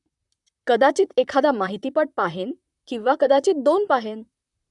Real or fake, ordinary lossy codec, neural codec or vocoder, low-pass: fake; none; vocoder, 44.1 kHz, 128 mel bands, Pupu-Vocoder; 10.8 kHz